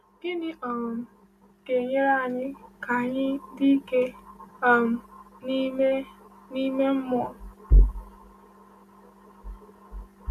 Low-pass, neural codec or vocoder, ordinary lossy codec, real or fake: 14.4 kHz; none; none; real